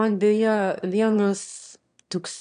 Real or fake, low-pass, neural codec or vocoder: fake; 9.9 kHz; autoencoder, 22.05 kHz, a latent of 192 numbers a frame, VITS, trained on one speaker